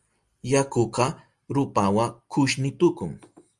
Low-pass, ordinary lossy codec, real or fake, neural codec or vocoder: 10.8 kHz; Opus, 32 kbps; real; none